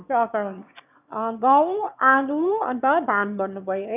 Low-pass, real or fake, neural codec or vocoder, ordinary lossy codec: 3.6 kHz; fake; autoencoder, 22.05 kHz, a latent of 192 numbers a frame, VITS, trained on one speaker; none